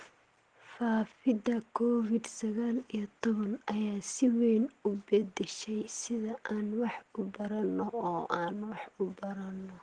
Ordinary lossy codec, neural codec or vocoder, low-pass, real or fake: Opus, 16 kbps; none; 9.9 kHz; real